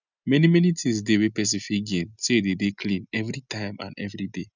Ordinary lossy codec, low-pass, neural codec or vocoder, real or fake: none; 7.2 kHz; none; real